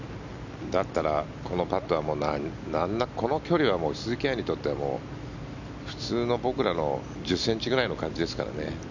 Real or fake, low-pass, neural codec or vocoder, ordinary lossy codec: real; 7.2 kHz; none; none